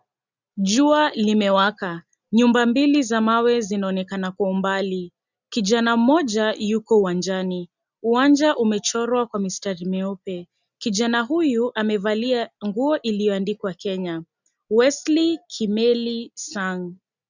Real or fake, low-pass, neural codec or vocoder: real; 7.2 kHz; none